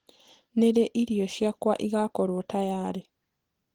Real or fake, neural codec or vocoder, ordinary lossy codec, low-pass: real; none; Opus, 16 kbps; 19.8 kHz